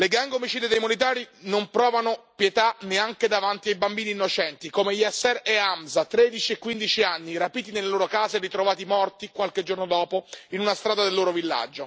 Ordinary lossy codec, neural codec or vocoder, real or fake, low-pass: none; none; real; none